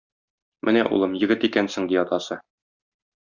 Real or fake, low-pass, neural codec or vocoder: real; 7.2 kHz; none